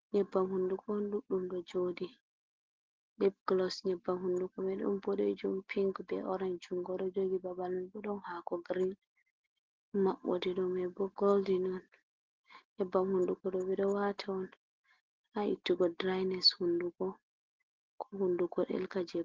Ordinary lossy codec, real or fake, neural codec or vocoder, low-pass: Opus, 16 kbps; real; none; 7.2 kHz